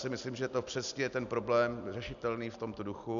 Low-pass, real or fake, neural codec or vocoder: 7.2 kHz; real; none